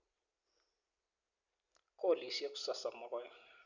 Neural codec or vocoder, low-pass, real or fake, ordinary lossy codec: none; 7.2 kHz; real; none